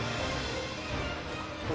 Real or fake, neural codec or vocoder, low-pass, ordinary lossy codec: real; none; none; none